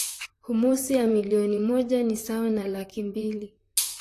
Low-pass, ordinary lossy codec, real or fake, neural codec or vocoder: 14.4 kHz; AAC, 48 kbps; fake; vocoder, 44.1 kHz, 128 mel bands, Pupu-Vocoder